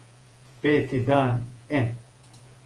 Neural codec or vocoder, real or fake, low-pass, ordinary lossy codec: vocoder, 48 kHz, 128 mel bands, Vocos; fake; 10.8 kHz; Opus, 24 kbps